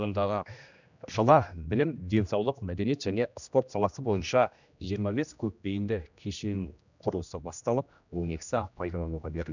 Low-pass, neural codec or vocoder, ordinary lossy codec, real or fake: 7.2 kHz; codec, 16 kHz, 1 kbps, X-Codec, HuBERT features, trained on general audio; none; fake